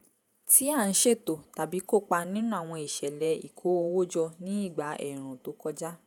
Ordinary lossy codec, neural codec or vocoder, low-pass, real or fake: none; vocoder, 48 kHz, 128 mel bands, Vocos; none; fake